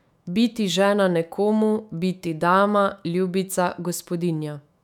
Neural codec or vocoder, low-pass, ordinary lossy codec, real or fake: autoencoder, 48 kHz, 128 numbers a frame, DAC-VAE, trained on Japanese speech; 19.8 kHz; none; fake